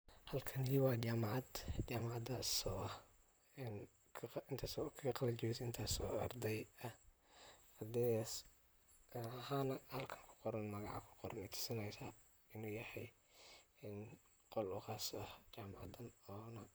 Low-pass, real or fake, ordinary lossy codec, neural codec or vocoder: none; fake; none; vocoder, 44.1 kHz, 128 mel bands, Pupu-Vocoder